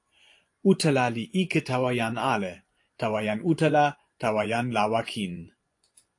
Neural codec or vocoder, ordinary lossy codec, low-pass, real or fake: vocoder, 24 kHz, 100 mel bands, Vocos; AAC, 48 kbps; 10.8 kHz; fake